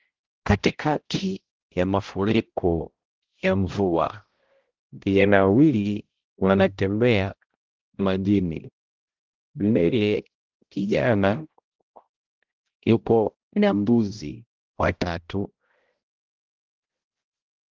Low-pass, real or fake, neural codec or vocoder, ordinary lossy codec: 7.2 kHz; fake; codec, 16 kHz, 0.5 kbps, X-Codec, HuBERT features, trained on balanced general audio; Opus, 16 kbps